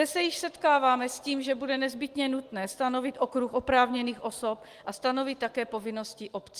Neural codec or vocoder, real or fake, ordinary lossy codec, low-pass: none; real; Opus, 24 kbps; 14.4 kHz